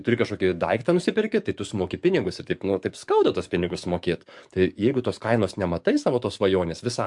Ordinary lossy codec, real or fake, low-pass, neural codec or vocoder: MP3, 64 kbps; fake; 10.8 kHz; codec, 44.1 kHz, 7.8 kbps, DAC